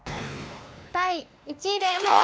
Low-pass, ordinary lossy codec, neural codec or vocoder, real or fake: none; none; codec, 16 kHz, 2 kbps, X-Codec, WavLM features, trained on Multilingual LibriSpeech; fake